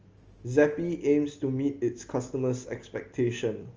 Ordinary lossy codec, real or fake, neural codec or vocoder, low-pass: Opus, 24 kbps; real; none; 7.2 kHz